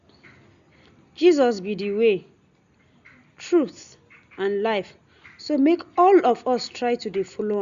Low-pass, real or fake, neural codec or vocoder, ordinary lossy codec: 7.2 kHz; real; none; Opus, 64 kbps